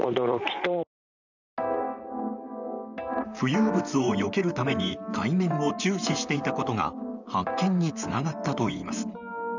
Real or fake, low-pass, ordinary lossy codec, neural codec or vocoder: fake; 7.2 kHz; none; vocoder, 44.1 kHz, 128 mel bands, Pupu-Vocoder